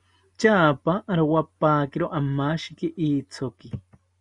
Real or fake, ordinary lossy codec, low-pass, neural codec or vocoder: real; Opus, 64 kbps; 10.8 kHz; none